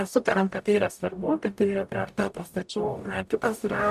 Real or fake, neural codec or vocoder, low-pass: fake; codec, 44.1 kHz, 0.9 kbps, DAC; 14.4 kHz